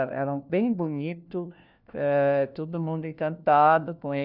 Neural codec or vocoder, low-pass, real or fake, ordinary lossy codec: codec, 16 kHz, 1 kbps, FunCodec, trained on LibriTTS, 50 frames a second; 5.4 kHz; fake; none